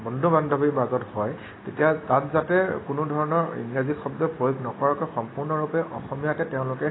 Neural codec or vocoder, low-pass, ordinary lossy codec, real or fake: none; 7.2 kHz; AAC, 16 kbps; real